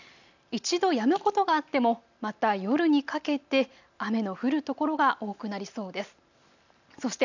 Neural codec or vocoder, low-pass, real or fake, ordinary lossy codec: none; 7.2 kHz; real; none